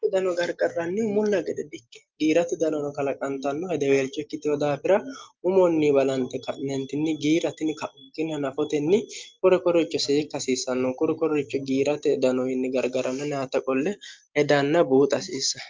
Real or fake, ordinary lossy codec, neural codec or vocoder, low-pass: real; Opus, 32 kbps; none; 7.2 kHz